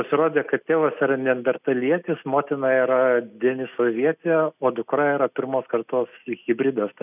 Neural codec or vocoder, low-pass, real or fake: none; 3.6 kHz; real